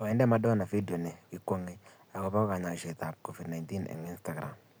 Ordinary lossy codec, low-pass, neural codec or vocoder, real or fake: none; none; none; real